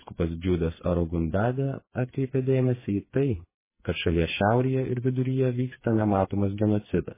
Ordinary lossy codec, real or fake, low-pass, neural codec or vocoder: MP3, 16 kbps; fake; 3.6 kHz; codec, 16 kHz, 4 kbps, FreqCodec, smaller model